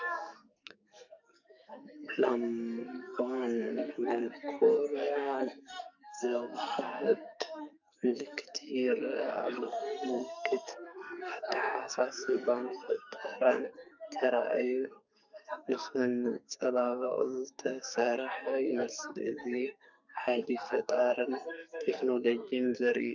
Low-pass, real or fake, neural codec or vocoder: 7.2 kHz; fake; codec, 44.1 kHz, 2.6 kbps, SNAC